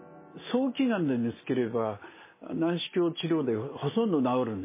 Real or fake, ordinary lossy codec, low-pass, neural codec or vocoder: real; MP3, 16 kbps; 3.6 kHz; none